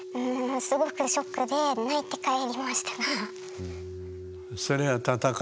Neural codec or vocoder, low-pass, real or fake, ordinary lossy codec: none; none; real; none